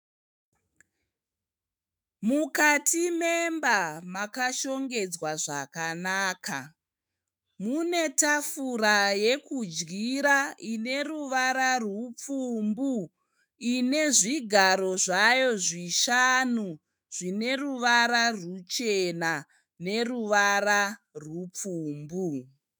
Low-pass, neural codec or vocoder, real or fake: 19.8 kHz; autoencoder, 48 kHz, 128 numbers a frame, DAC-VAE, trained on Japanese speech; fake